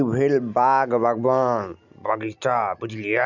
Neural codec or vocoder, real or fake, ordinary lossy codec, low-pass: none; real; none; 7.2 kHz